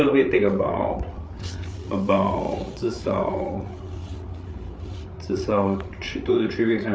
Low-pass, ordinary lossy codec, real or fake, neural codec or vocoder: none; none; fake; codec, 16 kHz, 8 kbps, FreqCodec, larger model